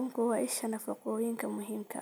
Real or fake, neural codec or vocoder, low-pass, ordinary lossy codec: real; none; none; none